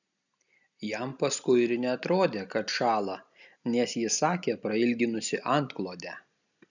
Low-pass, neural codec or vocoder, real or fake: 7.2 kHz; none; real